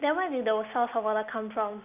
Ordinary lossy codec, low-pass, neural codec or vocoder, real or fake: none; 3.6 kHz; none; real